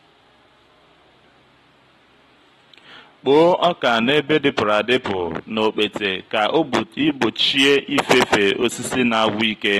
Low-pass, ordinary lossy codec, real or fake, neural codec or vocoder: 19.8 kHz; AAC, 32 kbps; real; none